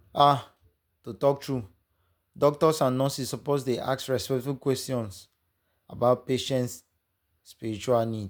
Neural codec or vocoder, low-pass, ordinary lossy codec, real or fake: none; none; none; real